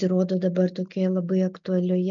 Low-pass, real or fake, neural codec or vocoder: 7.2 kHz; real; none